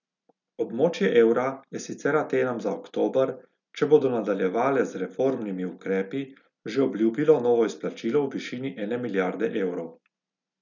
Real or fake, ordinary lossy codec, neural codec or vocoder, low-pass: real; none; none; 7.2 kHz